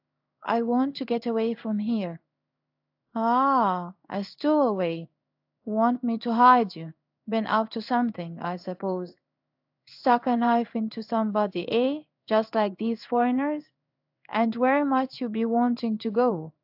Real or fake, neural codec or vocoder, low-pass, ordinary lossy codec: fake; codec, 16 kHz in and 24 kHz out, 1 kbps, XY-Tokenizer; 5.4 kHz; AAC, 48 kbps